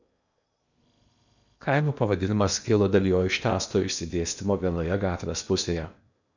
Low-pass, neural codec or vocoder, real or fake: 7.2 kHz; codec, 16 kHz in and 24 kHz out, 0.8 kbps, FocalCodec, streaming, 65536 codes; fake